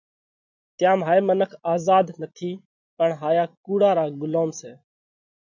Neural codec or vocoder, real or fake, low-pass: none; real; 7.2 kHz